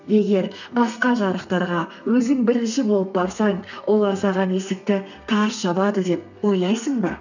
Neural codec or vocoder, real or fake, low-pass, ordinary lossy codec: codec, 32 kHz, 1.9 kbps, SNAC; fake; 7.2 kHz; none